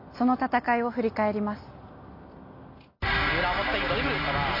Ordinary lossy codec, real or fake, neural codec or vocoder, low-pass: MP3, 32 kbps; real; none; 5.4 kHz